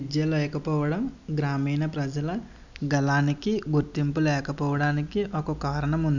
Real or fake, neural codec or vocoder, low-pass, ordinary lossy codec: real; none; 7.2 kHz; none